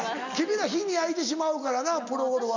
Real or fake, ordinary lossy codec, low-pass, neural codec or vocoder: real; none; 7.2 kHz; none